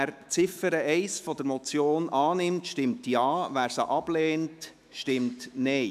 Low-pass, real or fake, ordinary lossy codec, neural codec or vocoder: 14.4 kHz; fake; none; autoencoder, 48 kHz, 128 numbers a frame, DAC-VAE, trained on Japanese speech